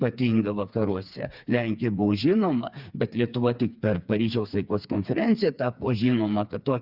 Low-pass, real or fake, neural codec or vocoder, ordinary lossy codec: 5.4 kHz; fake; codec, 16 kHz, 4 kbps, FreqCodec, smaller model; Opus, 64 kbps